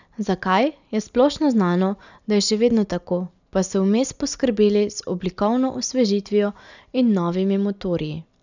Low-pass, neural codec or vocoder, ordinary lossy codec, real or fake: 7.2 kHz; none; none; real